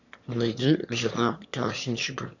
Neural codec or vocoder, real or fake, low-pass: autoencoder, 22.05 kHz, a latent of 192 numbers a frame, VITS, trained on one speaker; fake; 7.2 kHz